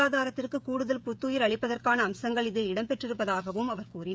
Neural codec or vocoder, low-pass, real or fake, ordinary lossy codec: codec, 16 kHz, 16 kbps, FreqCodec, smaller model; none; fake; none